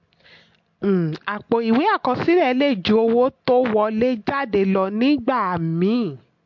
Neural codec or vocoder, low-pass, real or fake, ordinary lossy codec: none; 7.2 kHz; real; MP3, 48 kbps